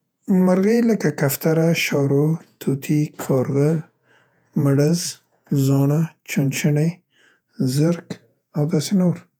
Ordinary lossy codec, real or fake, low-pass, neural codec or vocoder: none; fake; 19.8 kHz; vocoder, 48 kHz, 128 mel bands, Vocos